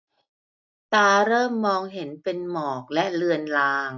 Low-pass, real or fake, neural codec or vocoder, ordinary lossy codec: 7.2 kHz; real; none; none